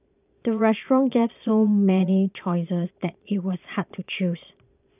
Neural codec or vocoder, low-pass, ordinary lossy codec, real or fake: vocoder, 22.05 kHz, 80 mel bands, Vocos; 3.6 kHz; none; fake